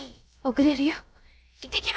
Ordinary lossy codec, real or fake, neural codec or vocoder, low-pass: none; fake; codec, 16 kHz, about 1 kbps, DyCAST, with the encoder's durations; none